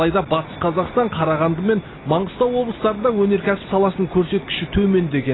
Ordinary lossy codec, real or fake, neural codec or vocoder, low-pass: AAC, 16 kbps; real; none; 7.2 kHz